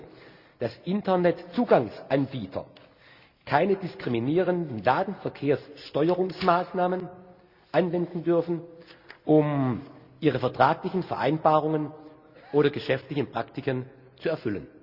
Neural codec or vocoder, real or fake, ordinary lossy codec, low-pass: none; real; Opus, 64 kbps; 5.4 kHz